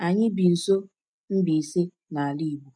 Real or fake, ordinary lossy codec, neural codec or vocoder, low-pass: real; none; none; 9.9 kHz